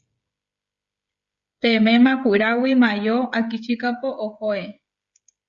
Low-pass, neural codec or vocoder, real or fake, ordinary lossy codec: 7.2 kHz; codec, 16 kHz, 16 kbps, FreqCodec, smaller model; fake; Opus, 64 kbps